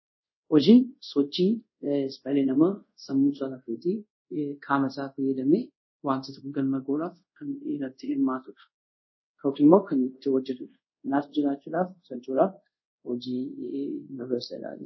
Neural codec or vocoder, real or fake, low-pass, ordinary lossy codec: codec, 24 kHz, 0.5 kbps, DualCodec; fake; 7.2 kHz; MP3, 24 kbps